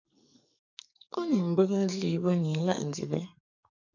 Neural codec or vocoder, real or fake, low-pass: codec, 44.1 kHz, 2.6 kbps, SNAC; fake; 7.2 kHz